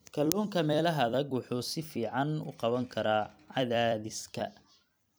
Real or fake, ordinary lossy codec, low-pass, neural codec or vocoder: fake; none; none; vocoder, 44.1 kHz, 128 mel bands every 256 samples, BigVGAN v2